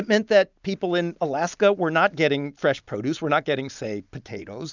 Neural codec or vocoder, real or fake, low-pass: none; real; 7.2 kHz